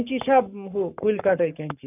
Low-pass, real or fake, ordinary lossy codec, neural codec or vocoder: 3.6 kHz; fake; none; codec, 44.1 kHz, 7.8 kbps, Pupu-Codec